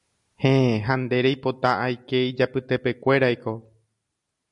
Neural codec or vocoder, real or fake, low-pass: none; real; 10.8 kHz